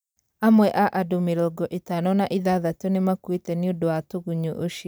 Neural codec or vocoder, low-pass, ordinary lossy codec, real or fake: vocoder, 44.1 kHz, 128 mel bands every 256 samples, BigVGAN v2; none; none; fake